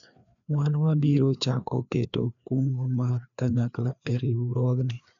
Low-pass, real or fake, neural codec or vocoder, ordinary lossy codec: 7.2 kHz; fake; codec, 16 kHz, 2 kbps, FreqCodec, larger model; MP3, 96 kbps